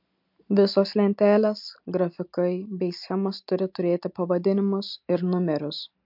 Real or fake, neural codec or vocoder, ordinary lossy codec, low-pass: real; none; MP3, 48 kbps; 5.4 kHz